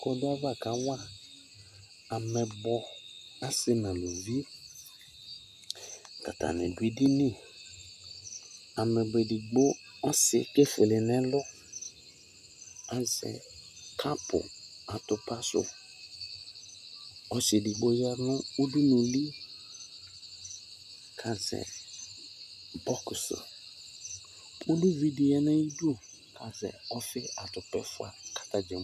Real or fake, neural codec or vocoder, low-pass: real; none; 14.4 kHz